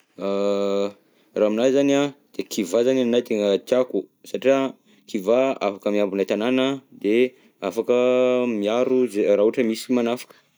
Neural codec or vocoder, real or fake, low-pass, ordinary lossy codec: none; real; none; none